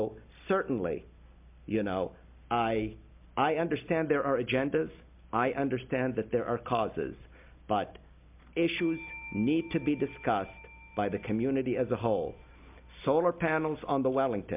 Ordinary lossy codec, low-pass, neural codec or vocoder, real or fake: MP3, 32 kbps; 3.6 kHz; none; real